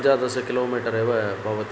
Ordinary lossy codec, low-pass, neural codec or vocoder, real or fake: none; none; none; real